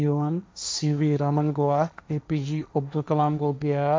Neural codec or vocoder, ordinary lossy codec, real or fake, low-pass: codec, 16 kHz, 1.1 kbps, Voila-Tokenizer; none; fake; none